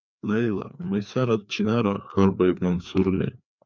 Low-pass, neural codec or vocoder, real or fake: 7.2 kHz; codec, 16 kHz, 2 kbps, FreqCodec, larger model; fake